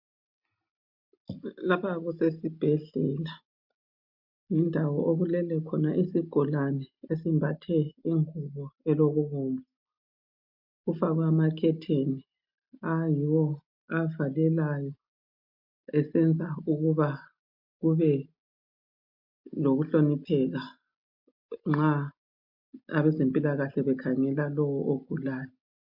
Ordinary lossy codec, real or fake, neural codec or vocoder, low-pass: MP3, 48 kbps; real; none; 5.4 kHz